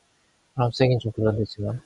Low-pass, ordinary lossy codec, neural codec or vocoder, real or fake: 10.8 kHz; MP3, 96 kbps; none; real